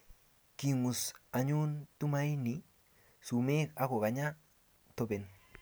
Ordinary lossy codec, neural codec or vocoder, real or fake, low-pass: none; none; real; none